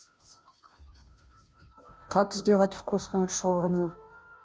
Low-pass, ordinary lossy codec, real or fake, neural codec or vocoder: none; none; fake; codec, 16 kHz, 0.5 kbps, FunCodec, trained on Chinese and English, 25 frames a second